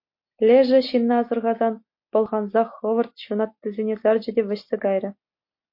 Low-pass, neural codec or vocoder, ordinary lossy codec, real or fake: 5.4 kHz; none; MP3, 32 kbps; real